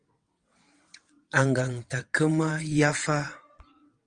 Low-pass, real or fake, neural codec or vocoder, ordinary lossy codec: 9.9 kHz; fake; vocoder, 22.05 kHz, 80 mel bands, WaveNeXt; Opus, 32 kbps